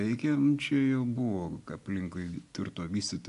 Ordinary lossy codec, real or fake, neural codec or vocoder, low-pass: AAC, 64 kbps; real; none; 10.8 kHz